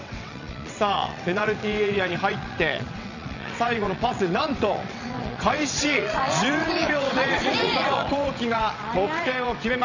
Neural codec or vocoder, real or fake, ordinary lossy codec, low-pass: vocoder, 22.05 kHz, 80 mel bands, WaveNeXt; fake; none; 7.2 kHz